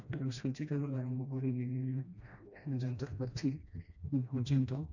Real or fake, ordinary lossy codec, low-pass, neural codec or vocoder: fake; none; 7.2 kHz; codec, 16 kHz, 1 kbps, FreqCodec, smaller model